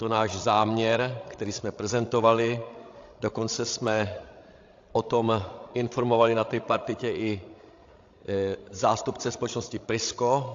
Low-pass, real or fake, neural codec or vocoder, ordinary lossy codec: 7.2 kHz; fake; codec, 16 kHz, 16 kbps, FreqCodec, larger model; AAC, 48 kbps